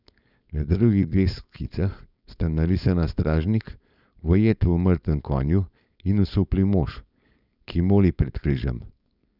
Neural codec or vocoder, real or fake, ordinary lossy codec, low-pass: codec, 16 kHz, 4.8 kbps, FACodec; fake; none; 5.4 kHz